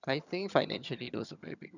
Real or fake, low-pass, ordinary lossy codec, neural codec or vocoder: fake; 7.2 kHz; none; vocoder, 22.05 kHz, 80 mel bands, HiFi-GAN